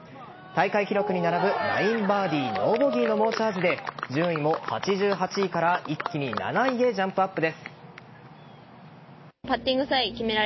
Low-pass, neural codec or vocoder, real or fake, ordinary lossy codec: 7.2 kHz; none; real; MP3, 24 kbps